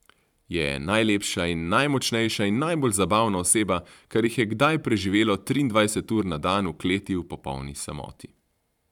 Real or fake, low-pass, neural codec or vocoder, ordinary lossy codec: fake; 19.8 kHz; vocoder, 44.1 kHz, 128 mel bands every 512 samples, BigVGAN v2; none